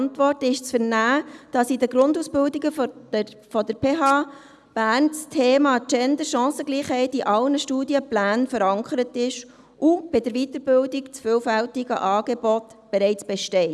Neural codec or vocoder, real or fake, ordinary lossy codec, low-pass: none; real; none; none